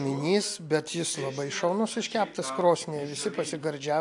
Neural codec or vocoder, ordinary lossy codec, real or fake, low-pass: none; AAC, 64 kbps; real; 10.8 kHz